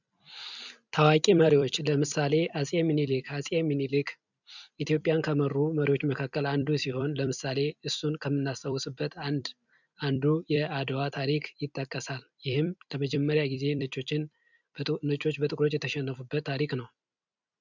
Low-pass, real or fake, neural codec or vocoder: 7.2 kHz; fake; vocoder, 44.1 kHz, 128 mel bands every 256 samples, BigVGAN v2